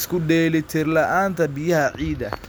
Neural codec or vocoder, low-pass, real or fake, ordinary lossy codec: none; none; real; none